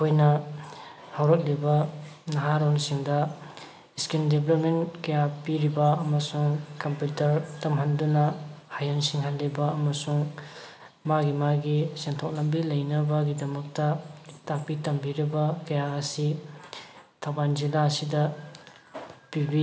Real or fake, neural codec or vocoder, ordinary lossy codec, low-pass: real; none; none; none